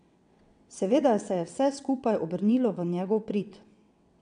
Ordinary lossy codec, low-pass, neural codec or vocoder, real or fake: AAC, 96 kbps; 9.9 kHz; vocoder, 22.05 kHz, 80 mel bands, WaveNeXt; fake